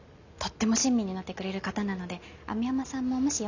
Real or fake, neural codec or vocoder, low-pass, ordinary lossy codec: real; none; 7.2 kHz; none